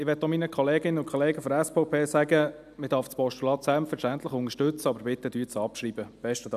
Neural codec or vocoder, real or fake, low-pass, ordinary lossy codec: none; real; 14.4 kHz; none